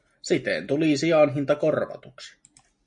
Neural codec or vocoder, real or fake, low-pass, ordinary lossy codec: none; real; 9.9 kHz; MP3, 64 kbps